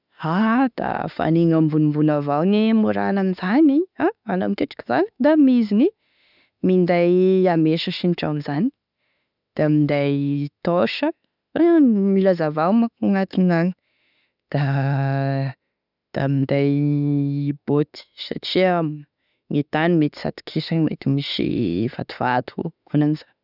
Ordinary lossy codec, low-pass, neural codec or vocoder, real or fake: none; 5.4 kHz; autoencoder, 48 kHz, 32 numbers a frame, DAC-VAE, trained on Japanese speech; fake